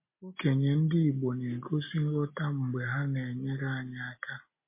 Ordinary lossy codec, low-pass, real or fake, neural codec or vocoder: MP3, 24 kbps; 3.6 kHz; real; none